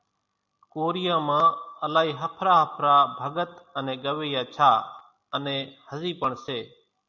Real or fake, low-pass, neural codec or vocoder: real; 7.2 kHz; none